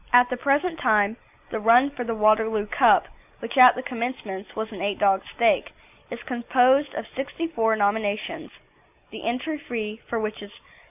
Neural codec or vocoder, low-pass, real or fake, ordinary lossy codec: none; 3.6 kHz; real; AAC, 32 kbps